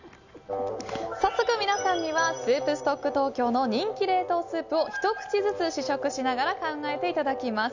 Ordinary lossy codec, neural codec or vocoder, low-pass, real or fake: none; none; 7.2 kHz; real